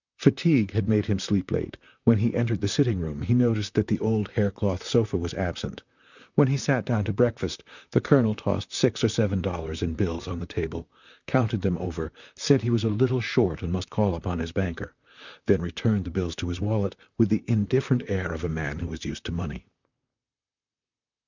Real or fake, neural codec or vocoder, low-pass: fake; vocoder, 44.1 kHz, 128 mel bands, Pupu-Vocoder; 7.2 kHz